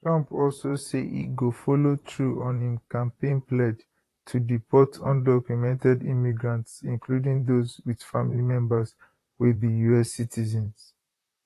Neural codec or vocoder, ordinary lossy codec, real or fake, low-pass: vocoder, 44.1 kHz, 128 mel bands, Pupu-Vocoder; AAC, 48 kbps; fake; 14.4 kHz